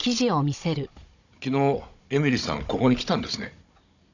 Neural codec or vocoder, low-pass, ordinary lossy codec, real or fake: codec, 16 kHz, 16 kbps, FunCodec, trained on Chinese and English, 50 frames a second; 7.2 kHz; none; fake